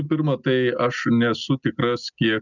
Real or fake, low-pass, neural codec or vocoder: real; 7.2 kHz; none